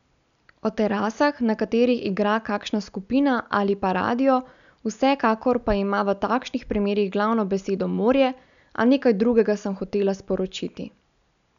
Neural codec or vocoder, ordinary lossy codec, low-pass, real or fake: none; none; 7.2 kHz; real